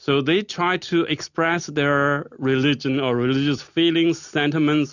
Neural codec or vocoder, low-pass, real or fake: none; 7.2 kHz; real